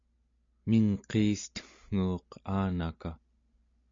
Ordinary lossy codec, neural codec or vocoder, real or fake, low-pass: MP3, 48 kbps; none; real; 7.2 kHz